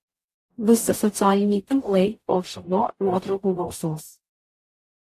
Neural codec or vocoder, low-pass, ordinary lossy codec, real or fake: codec, 44.1 kHz, 0.9 kbps, DAC; 14.4 kHz; AAC, 64 kbps; fake